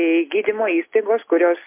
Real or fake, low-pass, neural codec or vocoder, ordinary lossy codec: real; 3.6 kHz; none; MP3, 24 kbps